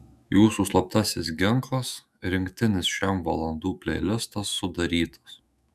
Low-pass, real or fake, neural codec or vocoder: 14.4 kHz; fake; autoencoder, 48 kHz, 128 numbers a frame, DAC-VAE, trained on Japanese speech